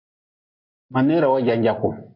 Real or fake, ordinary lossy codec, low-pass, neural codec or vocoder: real; MP3, 32 kbps; 5.4 kHz; none